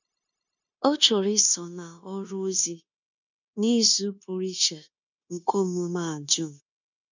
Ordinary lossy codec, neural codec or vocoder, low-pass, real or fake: none; codec, 16 kHz, 0.9 kbps, LongCat-Audio-Codec; 7.2 kHz; fake